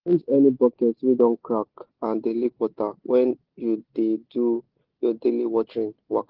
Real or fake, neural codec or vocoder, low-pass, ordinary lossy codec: real; none; 5.4 kHz; Opus, 16 kbps